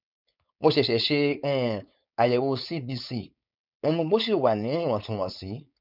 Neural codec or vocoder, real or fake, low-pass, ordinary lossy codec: codec, 16 kHz, 4.8 kbps, FACodec; fake; 5.4 kHz; none